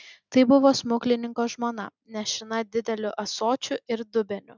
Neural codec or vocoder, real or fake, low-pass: none; real; 7.2 kHz